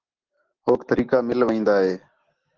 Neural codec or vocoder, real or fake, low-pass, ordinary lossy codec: none; real; 7.2 kHz; Opus, 16 kbps